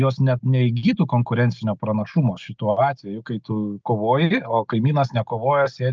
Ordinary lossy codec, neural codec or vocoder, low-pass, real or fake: Opus, 24 kbps; none; 7.2 kHz; real